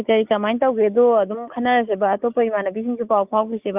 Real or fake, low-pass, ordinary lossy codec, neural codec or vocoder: real; 3.6 kHz; Opus, 64 kbps; none